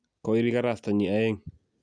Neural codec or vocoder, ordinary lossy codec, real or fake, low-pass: none; none; real; 9.9 kHz